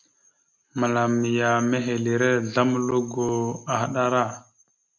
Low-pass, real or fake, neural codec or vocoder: 7.2 kHz; real; none